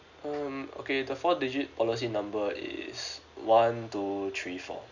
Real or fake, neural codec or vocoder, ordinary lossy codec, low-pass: real; none; none; 7.2 kHz